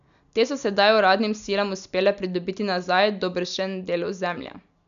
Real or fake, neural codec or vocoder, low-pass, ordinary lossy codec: real; none; 7.2 kHz; none